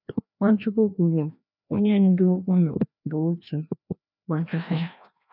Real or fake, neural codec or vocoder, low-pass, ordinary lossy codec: fake; codec, 16 kHz, 1 kbps, FreqCodec, larger model; 5.4 kHz; none